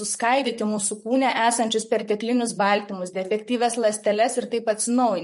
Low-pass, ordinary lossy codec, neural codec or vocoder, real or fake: 14.4 kHz; MP3, 48 kbps; vocoder, 44.1 kHz, 128 mel bands, Pupu-Vocoder; fake